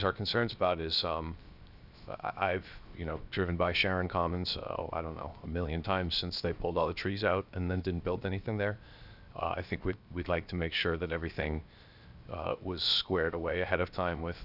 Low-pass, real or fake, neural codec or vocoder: 5.4 kHz; fake; codec, 16 kHz, 0.7 kbps, FocalCodec